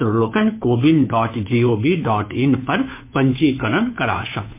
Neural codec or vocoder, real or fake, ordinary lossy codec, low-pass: codec, 16 kHz, 2 kbps, FunCodec, trained on Chinese and English, 25 frames a second; fake; MP3, 24 kbps; 3.6 kHz